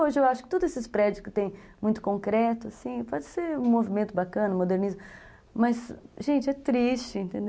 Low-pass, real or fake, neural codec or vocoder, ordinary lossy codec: none; real; none; none